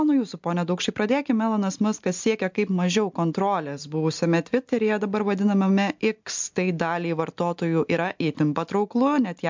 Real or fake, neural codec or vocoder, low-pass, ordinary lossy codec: real; none; 7.2 kHz; MP3, 64 kbps